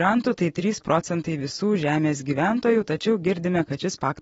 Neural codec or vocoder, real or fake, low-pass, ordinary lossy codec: vocoder, 44.1 kHz, 128 mel bands every 256 samples, BigVGAN v2; fake; 19.8 kHz; AAC, 24 kbps